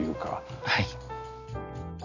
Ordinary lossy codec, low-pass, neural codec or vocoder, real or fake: none; 7.2 kHz; none; real